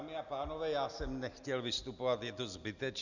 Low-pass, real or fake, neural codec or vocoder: 7.2 kHz; real; none